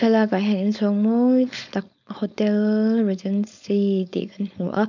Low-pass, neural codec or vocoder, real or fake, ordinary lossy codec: 7.2 kHz; codec, 16 kHz, 4.8 kbps, FACodec; fake; none